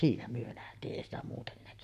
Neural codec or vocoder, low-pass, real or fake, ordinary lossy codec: codec, 44.1 kHz, 7.8 kbps, Pupu-Codec; 14.4 kHz; fake; none